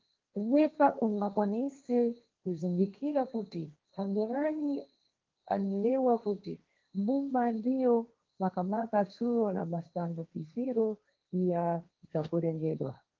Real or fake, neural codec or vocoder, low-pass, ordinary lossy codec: fake; codec, 16 kHz, 1.1 kbps, Voila-Tokenizer; 7.2 kHz; Opus, 32 kbps